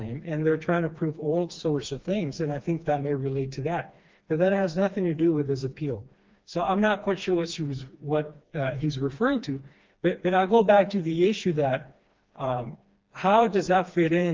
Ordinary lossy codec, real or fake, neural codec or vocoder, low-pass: Opus, 24 kbps; fake; codec, 16 kHz, 2 kbps, FreqCodec, smaller model; 7.2 kHz